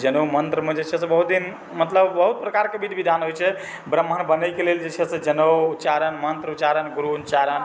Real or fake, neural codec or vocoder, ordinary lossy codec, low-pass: real; none; none; none